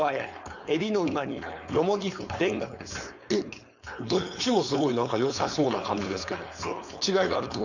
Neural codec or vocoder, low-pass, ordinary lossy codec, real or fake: codec, 16 kHz, 4.8 kbps, FACodec; 7.2 kHz; none; fake